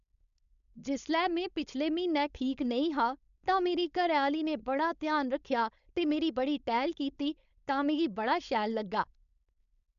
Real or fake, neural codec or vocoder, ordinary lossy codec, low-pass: fake; codec, 16 kHz, 4.8 kbps, FACodec; none; 7.2 kHz